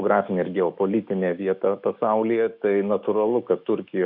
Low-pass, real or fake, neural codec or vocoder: 5.4 kHz; fake; autoencoder, 48 kHz, 128 numbers a frame, DAC-VAE, trained on Japanese speech